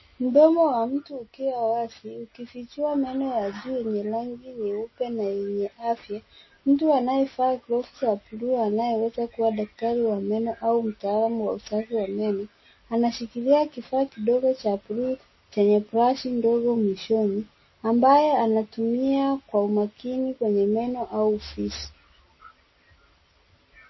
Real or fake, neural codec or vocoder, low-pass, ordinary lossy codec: real; none; 7.2 kHz; MP3, 24 kbps